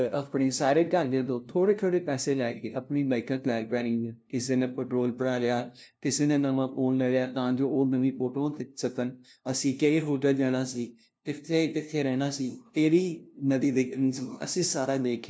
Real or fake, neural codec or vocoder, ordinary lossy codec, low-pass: fake; codec, 16 kHz, 0.5 kbps, FunCodec, trained on LibriTTS, 25 frames a second; none; none